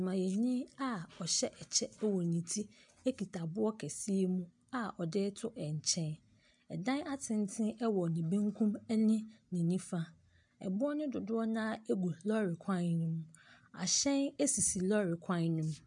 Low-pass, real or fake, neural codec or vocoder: 10.8 kHz; real; none